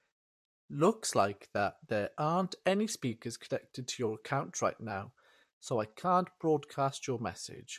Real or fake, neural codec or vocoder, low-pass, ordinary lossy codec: fake; vocoder, 44.1 kHz, 128 mel bands, Pupu-Vocoder; 14.4 kHz; MP3, 64 kbps